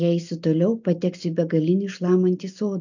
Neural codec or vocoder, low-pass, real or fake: none; 7.2 kHz; real